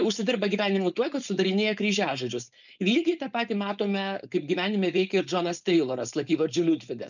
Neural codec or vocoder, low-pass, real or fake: codec, 16 kHz, 4.8 kbps, FACodec; 7.2 kHz; fake